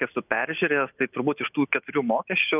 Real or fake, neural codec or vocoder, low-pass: real; none; 3.6 kHz